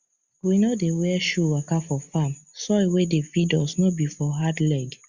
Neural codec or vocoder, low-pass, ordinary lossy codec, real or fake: none; 7.2 kHz; Opus, 64 kbps; real